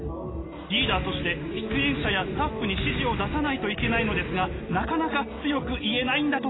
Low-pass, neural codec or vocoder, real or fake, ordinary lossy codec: 7.2 kHz; none; real; AAC, 16 kbps